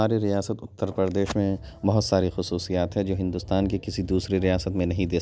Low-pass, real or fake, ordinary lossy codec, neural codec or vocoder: none; real; none; none